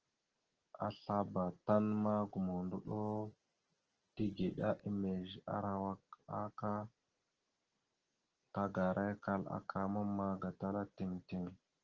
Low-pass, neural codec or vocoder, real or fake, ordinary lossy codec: 7.2 kHz; none; real; Opus, 16 kbps